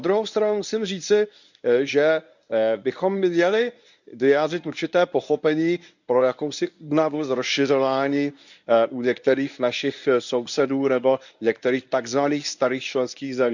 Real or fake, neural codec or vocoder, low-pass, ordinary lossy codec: fake; codec, 24 kHz, 0.9 kbps, WavTokenizer, medium speech release version 1; 7.2 kHz; none